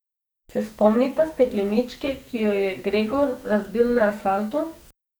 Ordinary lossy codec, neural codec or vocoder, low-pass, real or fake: none; codec, 44.1 kHz, 2.6 kbps, SNAC; none; fake